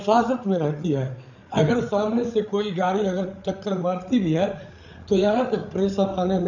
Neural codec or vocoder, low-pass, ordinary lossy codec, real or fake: codec, 16 kHz, 8 kbps, FreqCodec, larger model; 7.2 kHz; none; fake